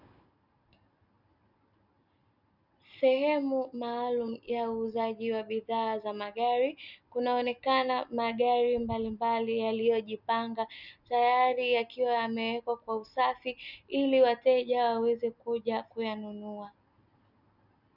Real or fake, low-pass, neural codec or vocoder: real; 5.4 kHz; none